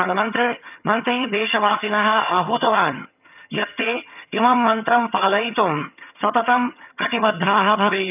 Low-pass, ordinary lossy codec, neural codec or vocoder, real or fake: 3.6 kHz; none; vocoder, 22.05 kHz, 80 mel bands, HiFi-GAN; fake